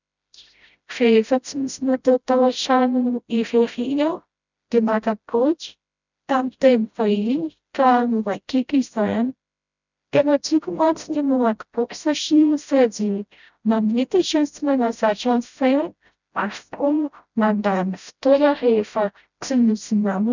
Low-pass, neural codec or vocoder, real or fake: 7.2 kHz; codec, 16 kHz, 0.5 kbps, FreqCodec, smaller model; fake